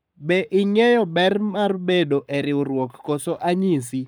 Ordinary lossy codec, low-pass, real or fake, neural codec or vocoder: none; none; fake; codec, 44.1 kHz, 7.8 kbps, Pupu-Codec